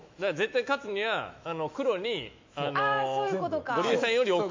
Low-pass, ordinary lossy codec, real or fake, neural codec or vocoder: 7.2 kHz; MP3, 48 kbps; fake; autoencoder, 48 kHz, 128 numbers a frame, DAC-VAE, trained on Japanese speech